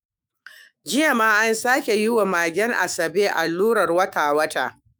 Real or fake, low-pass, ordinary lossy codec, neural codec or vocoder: fake; none; none; autoencoder, 48 kHz, 128 numbers a frame, DAC-VAE, trained on Japanese speech